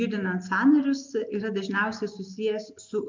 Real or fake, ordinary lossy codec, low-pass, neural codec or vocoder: real; MP3, 64 kbps; 7.2 kHz; none